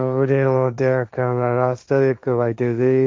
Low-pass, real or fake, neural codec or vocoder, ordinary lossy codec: none; fake; codec, 16 kHz, 1.1 kbps, Voila-Tokenizer; none